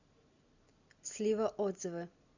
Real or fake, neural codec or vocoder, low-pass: real; none; 7.2 kHz